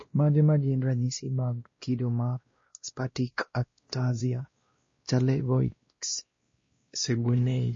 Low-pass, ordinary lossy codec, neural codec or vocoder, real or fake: 7.2 kHz; MP3, 32 kbps; codec, 16 kHz, 1 kbps, X-Codec, WavLM features, trained on Multilingual LibriSpeech; fake